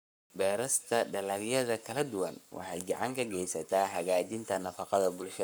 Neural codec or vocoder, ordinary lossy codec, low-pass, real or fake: codec, 44.1 kHz, 7.8 kbps, Pupu-Codec; none; none; fake